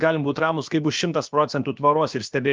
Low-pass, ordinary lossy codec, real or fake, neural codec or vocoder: 7.2 kHz; Opus, 24 kbps; fake; codec, 16 kHz, about 1 kbps, DyCAST, with the encoder's durations